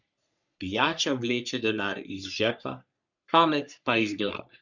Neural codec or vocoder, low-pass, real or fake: codec, 44.1 kHz, 3.4 kbps, Pupu-Codec; 7.2 kHz; fake